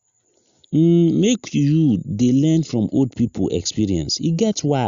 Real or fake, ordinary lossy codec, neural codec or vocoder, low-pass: real; Opus, 64 kbps; none; 7.2 kHz